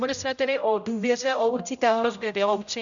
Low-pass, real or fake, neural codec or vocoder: 7.2 kHz; fake; codec, 16 kHz, 0.5 kbps, X-Codec, HuBERT features, trained on general audio